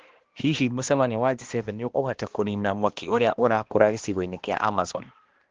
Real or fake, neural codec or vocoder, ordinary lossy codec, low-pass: fake; codec, 16 kHz, 1 kbps, X-Codec, HuBERT features, trained on balanced general audio; Opus, 16 kbps; 7.2 kHz